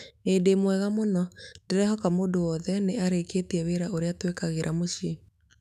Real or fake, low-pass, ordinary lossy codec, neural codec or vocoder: fake; 14.4 kHz; none; autoencoder, 48 kHz, 128 numbers a frame, DAC-VAE, trained on Japanese speech